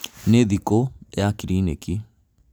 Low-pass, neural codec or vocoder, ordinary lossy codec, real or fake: none; none; none; real